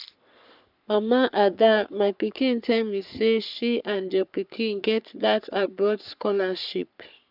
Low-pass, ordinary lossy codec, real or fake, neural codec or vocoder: 5.4 kHz; none; fake; codec, 44.1 kHz, 3.4 kbps, Pupu-Codec